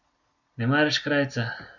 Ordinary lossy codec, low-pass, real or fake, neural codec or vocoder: none; 7.2 kHz; real; none